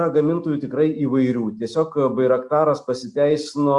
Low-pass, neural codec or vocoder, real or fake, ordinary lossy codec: 10.8 kHz; none; real; Opus, 64 kbps